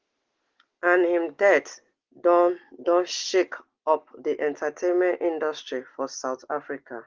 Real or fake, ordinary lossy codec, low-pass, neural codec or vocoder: real; Opus, 16 kbps; 7.2 kHz; none